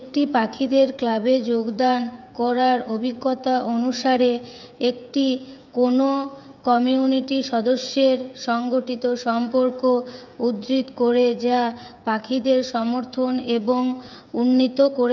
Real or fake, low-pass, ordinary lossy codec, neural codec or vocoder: fake; 7.2 kHz; none; codec, 16 kHz, 8 kbps, FreqCodec, smaller model